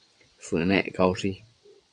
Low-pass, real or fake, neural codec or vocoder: 9.9 kHz; fake; vocoder, 22.05 kHz, 80 mel bands, WaveNeXt